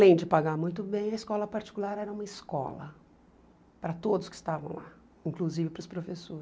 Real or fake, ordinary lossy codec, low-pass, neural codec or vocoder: real; none; none; none